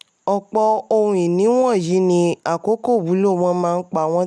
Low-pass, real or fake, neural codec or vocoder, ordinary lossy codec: none; real; none; none